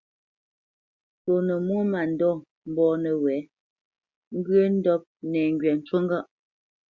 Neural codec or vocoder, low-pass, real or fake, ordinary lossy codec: none; 7.2 kHz; real; Opus, 64 kbps